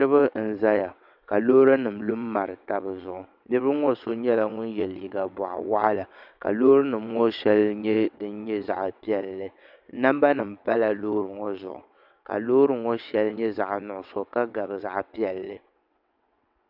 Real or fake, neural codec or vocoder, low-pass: fake; vocoder, 22.05 kHz, 80 mel bands, WaveNeXt; 5.4 kHz